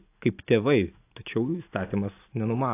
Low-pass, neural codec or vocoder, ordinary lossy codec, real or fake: 3.6 kHz; none; AAC, 24 kbps; real